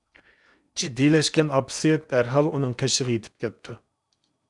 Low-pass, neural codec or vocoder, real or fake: 10.8 kHz; codec, 16 kHz in and 24 kHz out, 0.8 kbps, FocalCodec, streaming, 65536 codes; fake